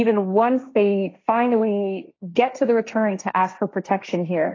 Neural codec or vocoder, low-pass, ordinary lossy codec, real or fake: codec, 16 kHz, 1.1 kbps, Voila-Tokenizer; 7.2 kHz; AAC, 32 kbps; fake